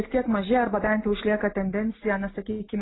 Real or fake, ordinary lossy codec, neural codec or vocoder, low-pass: real; AAC, 16 kbps; none; 7.2 kHz